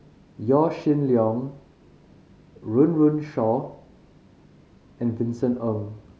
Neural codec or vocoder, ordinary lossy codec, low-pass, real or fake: none; none; none; real